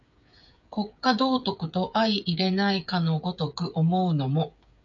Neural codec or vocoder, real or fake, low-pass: codec, 16 kHz, 8 kbps, FreqCodec, smaller model; fake; 7.2 kHz